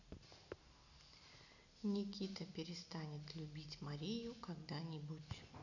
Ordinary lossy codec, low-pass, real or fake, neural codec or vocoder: none; 7.2 kHz; real; none